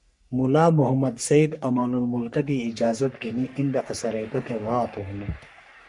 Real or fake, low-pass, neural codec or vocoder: fake; 10.8 kHz; codec, 44.1 kHz, 3.4 kbps, Pupu-Codec